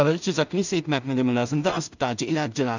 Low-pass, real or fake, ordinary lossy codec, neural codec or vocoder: 7.2 kHz; fake; none; codec, 16 kHz in and 24 kHz out, 0.4 kbps, LongCat-Audio-Codec, two codebook decoder